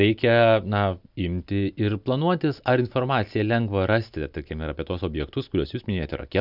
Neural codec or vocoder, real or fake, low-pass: none; real; 5.4 kHz